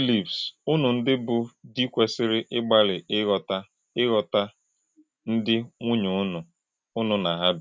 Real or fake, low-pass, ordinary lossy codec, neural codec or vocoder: real; none; none; none